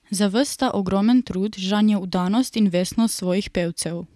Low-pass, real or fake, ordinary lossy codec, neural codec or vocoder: none; real; none; none